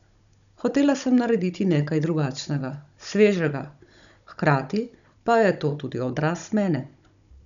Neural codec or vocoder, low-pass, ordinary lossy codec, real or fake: codec, 16 kHz, 16 kbps, FunCodec, trained on Chinese and English, 50 frames a second; 7.2 kHz; none; fake